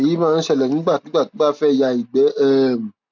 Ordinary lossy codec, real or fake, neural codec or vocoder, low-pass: none; real; none; 7.2 kHz